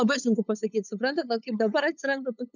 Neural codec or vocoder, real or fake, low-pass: codec, 16 kHz, 8 kbps, FunCodec, trained on LibriTTS, 25 frames a second; fake; 7.2 kHz